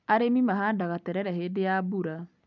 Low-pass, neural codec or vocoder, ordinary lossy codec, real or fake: 7.2 kHz; none; none; real